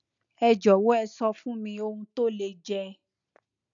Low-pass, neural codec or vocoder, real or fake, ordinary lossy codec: 7.2 kHz; none; real; none